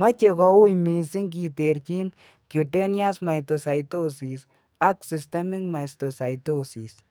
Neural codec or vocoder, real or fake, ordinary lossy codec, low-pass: codec, 44.1 kHz, 2.6 kbps, SNAC; fake; none; none